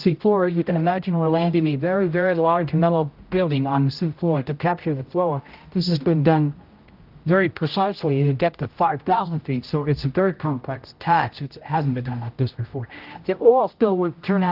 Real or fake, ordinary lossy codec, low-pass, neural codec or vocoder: fake; Opus, 24 kbps; 5.4 kHz; codec, 16 kHz, 0.5 kbps, X-Codec, HuBERT features, trained on general audio